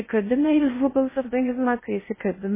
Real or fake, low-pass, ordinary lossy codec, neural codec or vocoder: fake; 3.6 kHz; MP3, 16 kbps; codec, 16 kHz in and 24 kHz out, 0.6 kbps, FocalCodec, streaming, 2048 codes